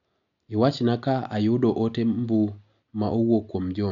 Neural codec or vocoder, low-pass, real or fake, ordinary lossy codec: none; 7.2 kHz; real; none